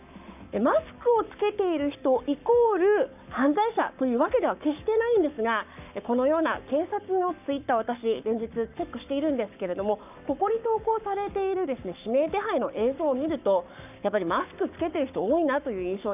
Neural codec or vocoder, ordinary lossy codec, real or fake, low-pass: codec, 44.1 kHz, 7.8 kbps, Pupu-Codec; none; fake; 3.6 kHz